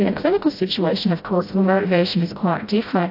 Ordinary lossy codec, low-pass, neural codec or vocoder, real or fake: AAC, 32 kbps; 5.4 kHz; codec, 16 kHz, 0.5 kbps, FreqCodec, smaller model; fake